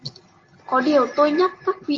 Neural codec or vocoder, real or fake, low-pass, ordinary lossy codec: none; real; 7.2 kHz; Opus, 32 kbps